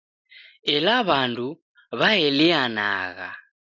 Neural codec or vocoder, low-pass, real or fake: none; 7.2 kHz; real